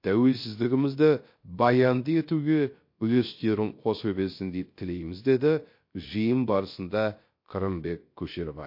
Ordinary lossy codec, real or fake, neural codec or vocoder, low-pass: MP3, 32 kbps; fake; codec, 16 kHz, 0.3 kbps, FocalCodec; 5.4 kHz